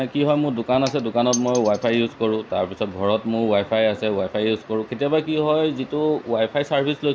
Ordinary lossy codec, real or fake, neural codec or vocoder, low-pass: none; real; none; none